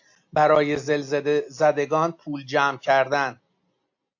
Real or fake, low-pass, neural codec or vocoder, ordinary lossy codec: real; 7.2 kHz; none; AAC, 48 kbps